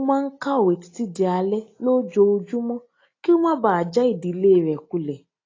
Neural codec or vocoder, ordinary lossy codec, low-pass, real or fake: none; AAC, 32 kbps; 7.2 kHz; real